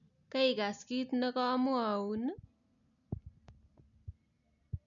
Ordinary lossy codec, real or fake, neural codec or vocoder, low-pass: none; real; none; 7.2 kHz